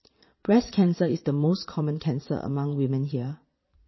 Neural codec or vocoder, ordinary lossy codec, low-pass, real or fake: vocoder, 22.05 kHz, 80 mel bands, Vocos; MP3, 24 kbps; 7.2 kHz; fake